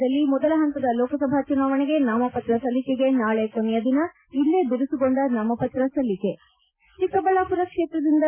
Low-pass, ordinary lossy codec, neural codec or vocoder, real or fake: 3.6 kHz; MP3, 32 kbps; none; real